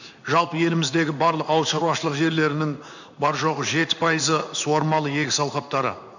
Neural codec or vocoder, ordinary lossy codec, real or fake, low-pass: codec, 16 kHz in and 24 kHz out, 1 kbps, XY-Tokenizer; none; fake; 7.2 kHz